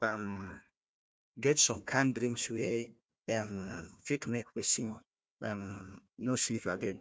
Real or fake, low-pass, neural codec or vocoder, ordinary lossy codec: fake; none; codec, 16 kHz, 1 kbps, FunCodec, trained on Chinese and English, 50 frames a second; none